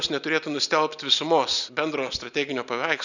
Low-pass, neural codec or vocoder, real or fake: 7.2 kHz; none; real